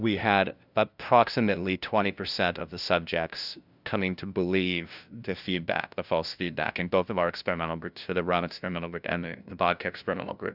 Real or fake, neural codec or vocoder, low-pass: fake; codec, 16 kHz, 0.5 kbps, FunCodec, trained on LibriTTS, 25 frames a second; 5.4 kHz